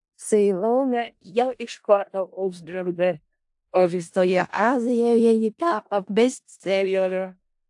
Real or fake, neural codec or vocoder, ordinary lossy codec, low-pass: fake; codec, 16 kHz in and 24 kHz out, 0.4 kbps, LongCat-Audio-Codec, four codebook decoder; AAC, 64 kbps; 10.8 kHz